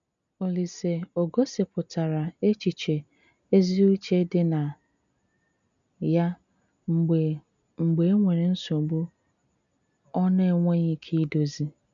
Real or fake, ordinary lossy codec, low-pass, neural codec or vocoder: real; none; 7.2 kHz; none